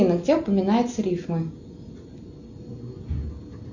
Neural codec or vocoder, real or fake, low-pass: none; real; 7.2 kHz